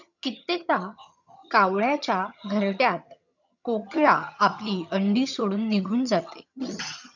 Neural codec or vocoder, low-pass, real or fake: vocoder, 22.05 kHz, 80 mel bands, HiFi-GAN; 7.2 kHz; fake